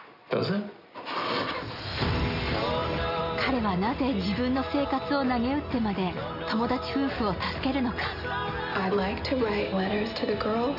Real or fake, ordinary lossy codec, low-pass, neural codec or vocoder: real; none; 5.4 kHz; none